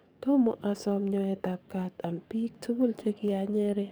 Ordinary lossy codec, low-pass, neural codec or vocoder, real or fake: none; none; codec, 44.1 kHz, 7.8 kbps, DAC; fake